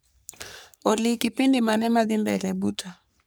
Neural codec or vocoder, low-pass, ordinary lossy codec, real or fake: codec, 44.1 kHz, 3.4 kbps, Pupu-Codec; none; none; fake